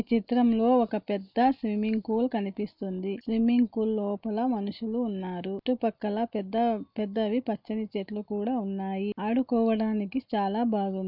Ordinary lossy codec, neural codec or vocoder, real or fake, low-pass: none; none; real; 5.4 kHz